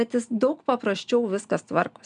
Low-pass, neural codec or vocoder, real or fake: 9.9 kHz; none; real